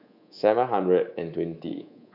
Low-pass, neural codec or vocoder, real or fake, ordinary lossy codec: 5.4 kHz; codec, 24 kHz, 3.1 kbps, DualCodec; fake; none